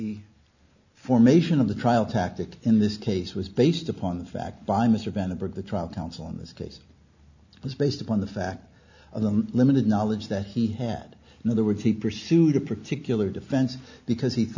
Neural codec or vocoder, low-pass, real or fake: none; 7.2 kHz; real